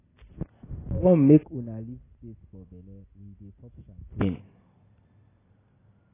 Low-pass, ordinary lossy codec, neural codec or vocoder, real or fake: 3.6 kHz; AAC, 16 kbps; none; real